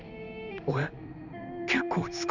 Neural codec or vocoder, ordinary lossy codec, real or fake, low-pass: codec, 16 kHz in and 24 kHz out, 1 kbps, XY-Tokenizer; none; fake; 7.2 kHz